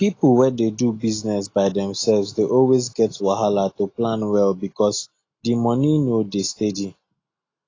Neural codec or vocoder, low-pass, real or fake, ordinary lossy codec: none; 7.2 kHz; real; AAC, 32 kbps